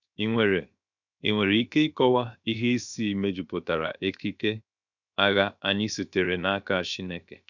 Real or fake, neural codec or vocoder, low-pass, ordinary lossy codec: fake; codec, 16 kHz, 0.7 kbps, FocalCodec; 7.2 kHz; none